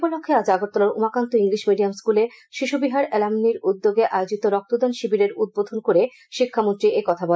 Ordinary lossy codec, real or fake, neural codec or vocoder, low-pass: none; real; none; none